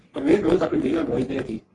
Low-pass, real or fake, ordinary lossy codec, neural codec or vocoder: 10.8 kHz; fake; AAC, 32 kbps; codec, 44.1 kHz, 3.4 kbps, Pupu-Codec